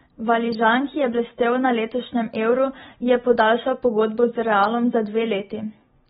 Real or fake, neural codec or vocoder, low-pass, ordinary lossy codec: real; none; 9.9 kHz; AAC, 16 kbps